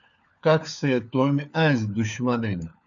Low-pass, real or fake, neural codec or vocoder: 7.2 kHz; fake; codec, 16 kHz, 4 kbps, FunCodec, trained on LibriTTS, 50 frames a second